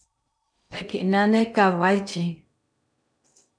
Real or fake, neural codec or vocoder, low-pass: fake; codec, 16 kHz in and 24 kHz out, 0.6 kbps, FocalCodec, streaming, 2048 codes; 9.9 kHz